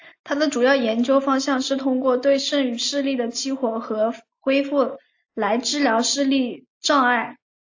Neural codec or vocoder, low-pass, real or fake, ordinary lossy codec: none; 7.2 kHz; real; AAC, 48 kbps